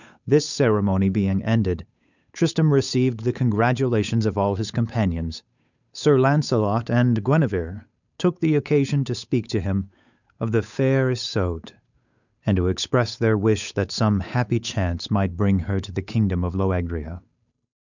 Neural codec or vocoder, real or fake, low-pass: codec, 16 kHz, 8 kbps, FunCodec, trained on Chinese and English, 25 frames a second; fake; 7.2 kHz